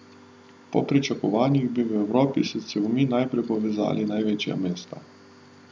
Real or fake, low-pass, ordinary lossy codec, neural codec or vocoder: real; none; none; none